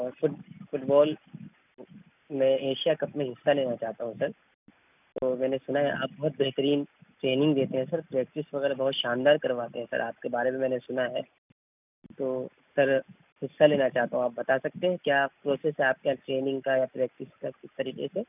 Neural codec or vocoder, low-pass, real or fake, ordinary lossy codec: none; 3.6 kHz; real; none